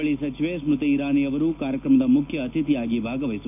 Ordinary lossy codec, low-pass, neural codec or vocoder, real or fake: none; 3.6 kHz; none; real